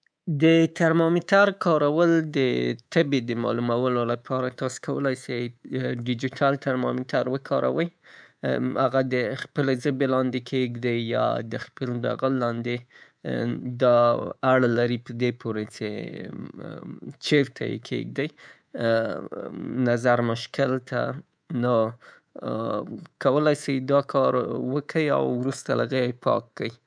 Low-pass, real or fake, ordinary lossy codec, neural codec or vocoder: 9.9 kHz; fake; none; codec, 24 kHz, 3.1 kbps, DualCodec